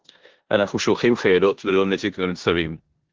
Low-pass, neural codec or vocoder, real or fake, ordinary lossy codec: 7.2 kHz; codec, 16 kHz in and 24 kHz out, 0.9 kbps, LongCat-Audio-Codec, four codebook decoder; fake; Opus, 16 kbps